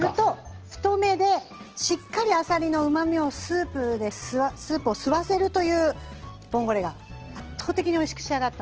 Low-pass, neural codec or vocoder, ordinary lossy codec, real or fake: 7.2 kHz; none; Opus, 16 kbps; real